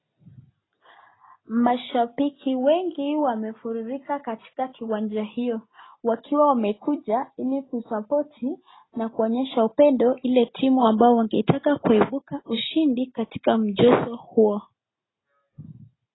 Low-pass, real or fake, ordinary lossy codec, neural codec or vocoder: 7.2 kHz; real; AAC, 16 kbps; none